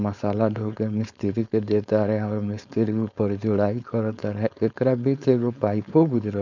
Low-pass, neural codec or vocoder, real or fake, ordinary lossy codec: 7.2 kHz; codec, 16 kHz, 4.8 kbps, FACodec; fake; none